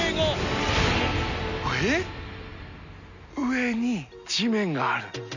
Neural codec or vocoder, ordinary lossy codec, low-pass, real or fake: none; none; 7.2 kHz; real